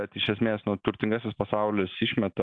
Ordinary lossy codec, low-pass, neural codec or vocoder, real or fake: AAC, 64 kbps; 9.9 kHz; none; real